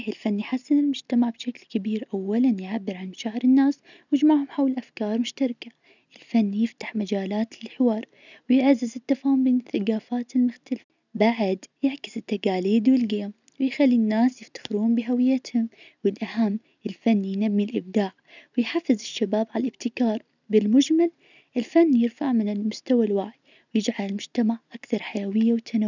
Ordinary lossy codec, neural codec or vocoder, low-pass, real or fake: none; none; 7.2 kHz; real